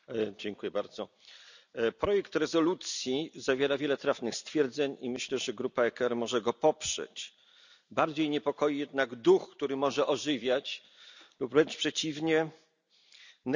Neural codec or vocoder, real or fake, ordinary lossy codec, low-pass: none; real; none; 7.2 kHz